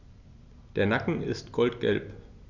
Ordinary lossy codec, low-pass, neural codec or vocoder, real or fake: none; 7.2 kHz; none; real